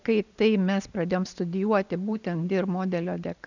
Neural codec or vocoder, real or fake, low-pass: none; real; 7.2 kHz